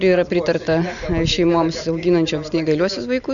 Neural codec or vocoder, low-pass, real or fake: none; 7.2 kHz; real